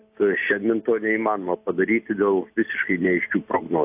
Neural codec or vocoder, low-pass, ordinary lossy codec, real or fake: none; 3.6 kHz; AAC, 32 kbps; real